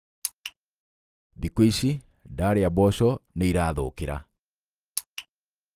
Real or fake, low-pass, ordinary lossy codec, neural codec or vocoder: real; 14.4 kHz; Opus, 24 kbps; none